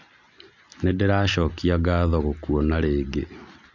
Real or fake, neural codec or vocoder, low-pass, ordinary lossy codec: real; none; 7.2 kHz; none